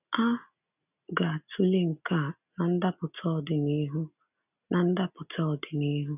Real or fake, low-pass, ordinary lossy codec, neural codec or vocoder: real; 3.6 kHz; none; none